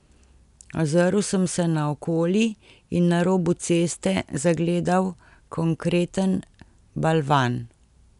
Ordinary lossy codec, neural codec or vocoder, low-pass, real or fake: MP3, 96 kbps; none; 10.8 kHz; real